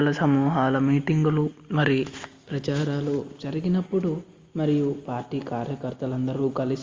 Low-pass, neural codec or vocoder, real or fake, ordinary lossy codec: 7.2 kHz; none; real; Opus, 32 kbps